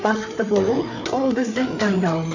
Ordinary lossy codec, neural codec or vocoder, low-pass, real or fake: none; codec, 16 kHz, 4 kbps, FreqCodec, larger model; 7.2 kHz; fake